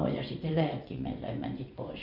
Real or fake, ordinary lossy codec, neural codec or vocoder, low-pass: fake; none; vocoder, 24 kHz, 100 mel bands, Vocos; 5.4 kHz